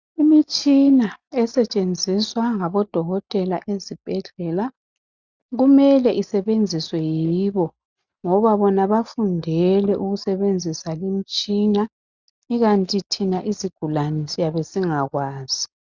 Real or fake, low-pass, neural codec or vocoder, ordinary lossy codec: real; 7.2 kHz; none; Opus, 64 kbps